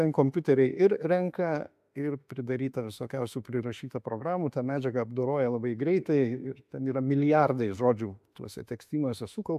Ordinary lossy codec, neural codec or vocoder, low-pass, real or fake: AAC, 96 kbps; autoencoder, 48 kHz, 32 numbers a frame, DAC-VAE, trained on Japanese speech; 14.4 kHz; fake